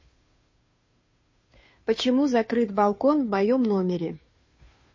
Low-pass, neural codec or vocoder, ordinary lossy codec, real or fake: 7.2 kHz; codec, 16 kHz, 2 kbps, FunCodec, trained on Chinese and English, 25 frames a second; MP3, 32 kbps; fake